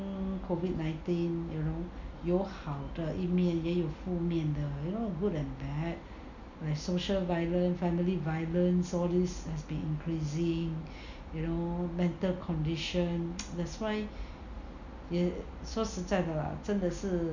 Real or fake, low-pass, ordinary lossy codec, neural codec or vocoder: real; 7.2 kHz; none; none